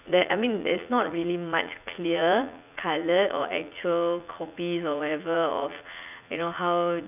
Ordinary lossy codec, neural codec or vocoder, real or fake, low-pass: none; vocoder, 44.1 kHz, 80 mel bands, Vocos; fake; 3.6 kHz